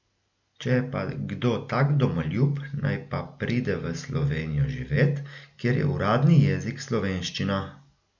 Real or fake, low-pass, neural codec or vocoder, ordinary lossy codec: real; 7.2 kHz; none; none